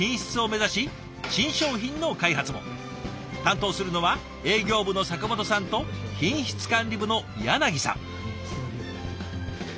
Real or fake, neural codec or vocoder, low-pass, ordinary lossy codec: real; none; none; none